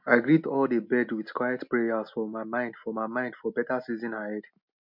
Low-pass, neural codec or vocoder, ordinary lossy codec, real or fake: 5.4 kHz; none; MP3, 48 kbps; real